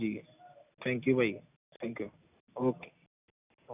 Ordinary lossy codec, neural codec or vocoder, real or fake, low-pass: none; none; real; 3.6 kHz